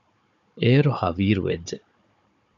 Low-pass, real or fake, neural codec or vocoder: 7.2 kHz; fake; codec, 16 kHz, 16 kbps, FunCodec, trained on Chinese and English, 50 frames a second